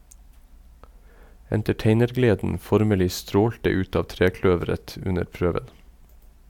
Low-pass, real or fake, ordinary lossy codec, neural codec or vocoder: 19.8 kHz; real; MP3, 96 kbps; none